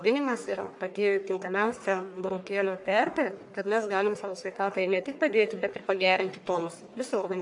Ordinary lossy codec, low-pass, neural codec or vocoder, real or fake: AAC, 64 kbps; 10.8 kHz; codec, 44.1 kHz, 1.7 kbps, Pupu-Codec; fake